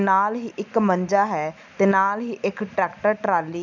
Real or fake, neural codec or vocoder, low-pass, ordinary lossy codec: real; none; 7.2 kHz; none